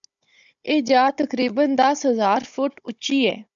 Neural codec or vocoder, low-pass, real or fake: codec, 16 kHz, 16 kbps, FunCodec, trained on Chinese and English, 50 frames a second; 7.2 kHz; fake